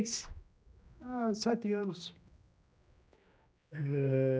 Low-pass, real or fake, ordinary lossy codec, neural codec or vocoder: none; fake; none; codec, 16 kHz, 1 kbps, X-Codec, HuBERT features, trained on general audio